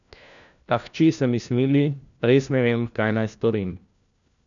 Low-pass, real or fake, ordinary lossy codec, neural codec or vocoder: 7.2 kHz; fake; none; codec, 16 kHz, 1 kbps, FunCodec, trained on LibriTTS, 50 frames a second